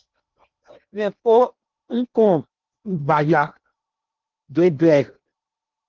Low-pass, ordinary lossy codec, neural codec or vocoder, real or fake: 7.2 kHz; Opus, 24 kbps; codec, 16 kHz in and 24 kHz out, 0.8 kbps, FocalCodec, streaming, 65536 codes; fake